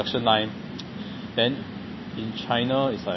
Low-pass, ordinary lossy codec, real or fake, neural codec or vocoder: 7.2 kHz; MP3, 24 kbps; real; none